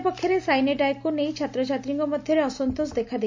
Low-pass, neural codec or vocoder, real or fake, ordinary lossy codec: 7.2 kHz; none; real; MP3, 64 kbps